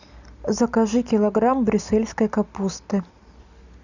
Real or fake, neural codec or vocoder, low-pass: real; none; 7.2 kHz